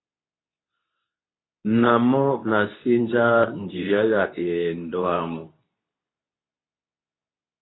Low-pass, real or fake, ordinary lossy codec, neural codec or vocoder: 7.2 kHz; fake; AAC, 16 kbps; codec, 24 kHz, 0.9 kbps, WavTokenizer, medium speech release version 2